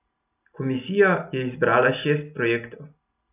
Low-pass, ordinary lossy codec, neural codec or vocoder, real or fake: 3.6 kHz; none; none; real